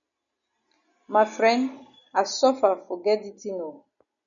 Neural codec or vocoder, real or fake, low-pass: none; real; 7.2 kHz